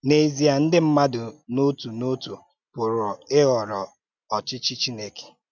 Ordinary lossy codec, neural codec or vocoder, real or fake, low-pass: none; none; real; 7.2 kHz